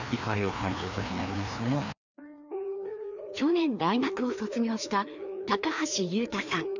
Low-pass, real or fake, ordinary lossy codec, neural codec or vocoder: 7.2 kHz; fake; none; codec, 16 kHz, 2 kbps, FreqCodec, larger model